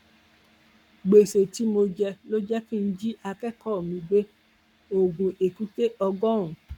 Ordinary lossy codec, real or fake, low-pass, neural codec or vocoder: none; fake; 19.8 kHz; codec, 44.1 kHz, 7.8 kbps, Pupu-Codec